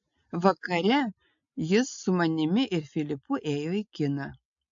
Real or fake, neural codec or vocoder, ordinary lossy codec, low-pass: real; none; AAC, 64 kbps; 7.2 kHz